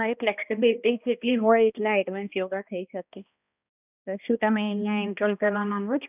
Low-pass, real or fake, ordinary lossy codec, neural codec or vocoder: 3.6 kHz; fake; none; codec, 16 kHz, 1 kbps, X-Codec, HuBERT features, trained on balanced general audio